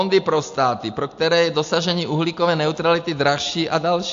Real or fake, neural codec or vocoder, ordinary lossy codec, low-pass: real; none; AAC, 64 kbps; 7.2 kHz